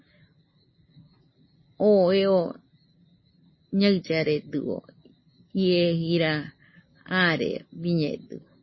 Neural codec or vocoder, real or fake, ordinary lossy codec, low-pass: none; real; MP3, 24 kbps; 7.2 kHz